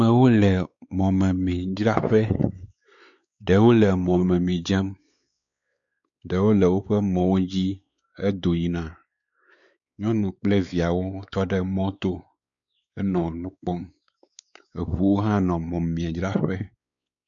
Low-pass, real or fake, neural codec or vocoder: 7.2 kHz; fake; codec, 16 kHz, 4 kbps, X-Codec, WavLM features, trained on Multilingual LibriSpeech